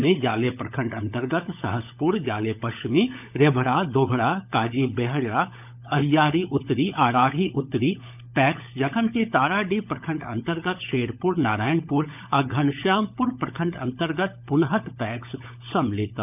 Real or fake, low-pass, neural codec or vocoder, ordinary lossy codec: fake; 3.6 kHz; codec, 16 kHz, 16 kbps, FunCodec, trained on LibriTTS, 50 frames a second; none